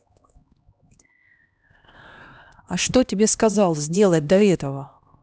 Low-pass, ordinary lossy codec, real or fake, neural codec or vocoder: none; none; fake; codec, 16 kHz, 1 kbps, X-Codec, HuBERT features, trained on LibriSpeech